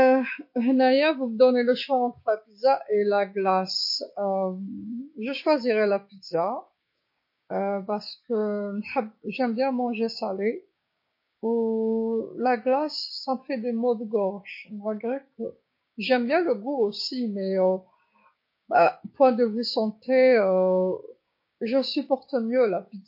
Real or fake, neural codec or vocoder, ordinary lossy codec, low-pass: fake; codec, 16 kHz, 6 kbps, DAC; MP3, 32 kbps; 5.4 kHz